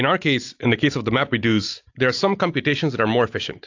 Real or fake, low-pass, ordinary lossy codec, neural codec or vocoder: real; 7.2 kHz; AAC, 48 kbps; none